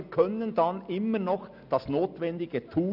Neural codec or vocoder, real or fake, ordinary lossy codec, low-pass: none; real; none; 5.4 kHz